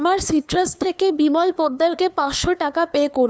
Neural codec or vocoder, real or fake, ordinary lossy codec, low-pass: codec, 16 kHz, 2 kbps, FunCodec, trained on LibriTTS, 25 frames a second; fake; none; none